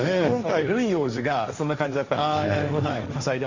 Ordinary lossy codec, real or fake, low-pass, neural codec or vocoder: Opus, 64 kbps; fake; 7.2 kHz; codec, 16 kHz, 1.1 kbps, Voila-Tokenizer